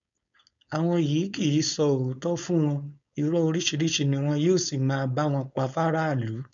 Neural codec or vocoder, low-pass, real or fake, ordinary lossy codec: codec, 16 kHz, 4.8 kbps, FACodec; 7.2 kHz; fake; none